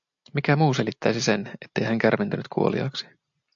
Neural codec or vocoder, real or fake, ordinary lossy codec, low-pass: none; real; AAC, 64 kbps; 7.2 kHz